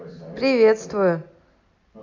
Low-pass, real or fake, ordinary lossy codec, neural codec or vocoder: 7.2 kHz; real; AAC, 48 kbps; none